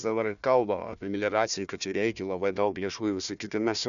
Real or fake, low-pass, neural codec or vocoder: fake; 7.2 kHz; codec, 16 kHz, 1 kbps, FunCodec, trained on Chinese and English, 50 frames a second